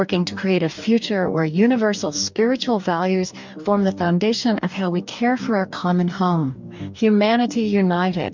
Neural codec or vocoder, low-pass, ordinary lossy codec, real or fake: codec, 44.1 kHz, 2.6 kbps, DAC; 7.2 kHz; MP3, 64 kbps; fake